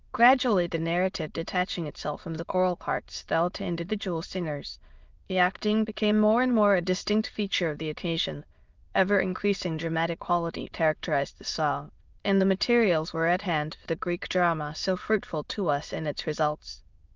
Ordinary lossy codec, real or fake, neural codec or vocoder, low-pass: Opus, 24 kbps; fake; autoencoder, 22.05 kHz, a latent of 192 numbers a frame, VITS, trained on many speakers; 7.2 kHz